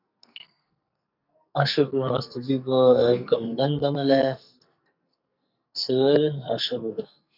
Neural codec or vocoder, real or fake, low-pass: codec, 44.1 kHz, 2.6 kbps, SNAC; fake; 5.4 kHz